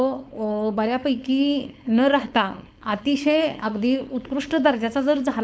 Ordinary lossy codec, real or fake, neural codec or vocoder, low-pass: none; fake; codec, 16 kHz, 4.8 kbps, FACodec; none